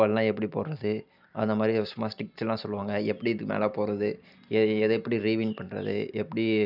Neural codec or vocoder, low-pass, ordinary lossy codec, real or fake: none; 5.4 kHz; none; real